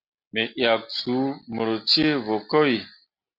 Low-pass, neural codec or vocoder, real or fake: 5.4 kHz; none; real